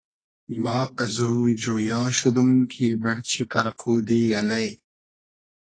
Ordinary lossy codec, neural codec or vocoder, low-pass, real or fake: AAC, 32 kbps; codec, 24 kHz, 0.9 kbps, WavTokenizer, medium music audio release; 9.9 kHz; fake